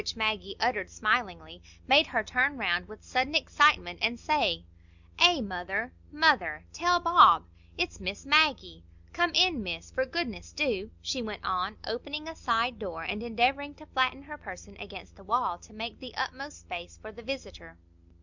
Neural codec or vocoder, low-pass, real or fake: none; 7.2 kHz; real